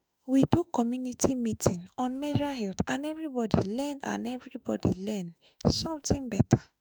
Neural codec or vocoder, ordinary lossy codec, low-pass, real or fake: autoencoder, 48 kHz, 32 numbers a frame, DAC-VAE, trained on Japanese speech; none; none; fake